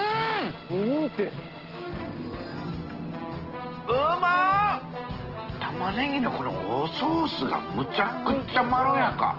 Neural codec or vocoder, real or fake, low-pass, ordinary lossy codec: none; real; 5.4 kHz; Opus, 16 kbps